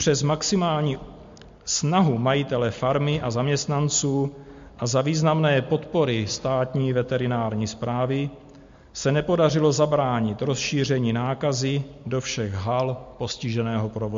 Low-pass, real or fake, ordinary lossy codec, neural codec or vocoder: 7.2 kHz; real; MP3, 48 kbps; none